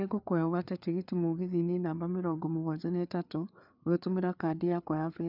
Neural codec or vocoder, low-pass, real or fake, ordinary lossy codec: codec, 16 kHz, 4 kbps, FreqCodec, larger model; 5.4 kHz; fake; none